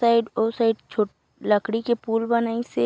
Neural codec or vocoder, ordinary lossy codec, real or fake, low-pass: none; none; real; none